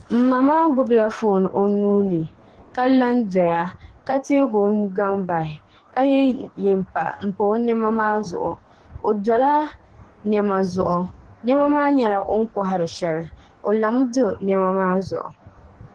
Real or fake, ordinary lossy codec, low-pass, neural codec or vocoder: fake; Opus, 16 kbps; 10.8 kHz; codec, 44.1 kHz, 2.6 kbps, DAC